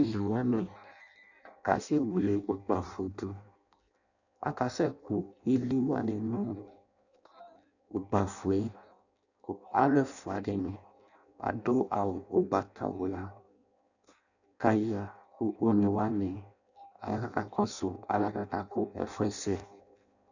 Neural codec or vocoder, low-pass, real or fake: codec, 16 kHz in and 24 kHz out, 0.6 kbps, FireRedTTS-2 codec; 7.2 kHz; fake